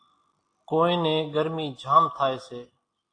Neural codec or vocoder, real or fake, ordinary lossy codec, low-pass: none; real; AAC, 48 kbps; 9.9 kHz